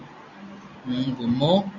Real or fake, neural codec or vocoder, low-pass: real; none; 7.2 kHz